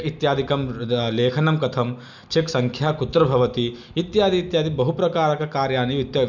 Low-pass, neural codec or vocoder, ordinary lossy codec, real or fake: 7.2 kHz; none; none; real